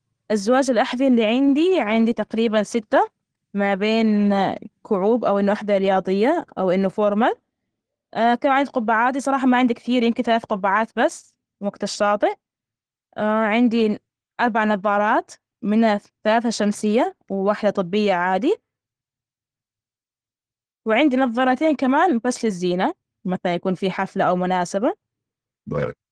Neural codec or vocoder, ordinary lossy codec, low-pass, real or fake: none; Opus, 16 kbps; 9.9 kHz; real